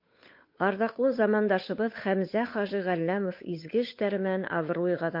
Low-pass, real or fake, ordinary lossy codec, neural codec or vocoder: 5.4 kHz; fake; MP3, 32 kbps; codec, 16 kHz, 8 kbps, FunCodec, trained on Chinese and English, 25 frames a second